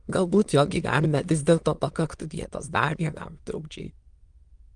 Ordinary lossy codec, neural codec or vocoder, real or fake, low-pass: Opus, 24 kbps; autoencoder, 22.05 kHz, a latent of 192 numbers a frame, VITS, trained on many speakers; fake; 9.9 kHz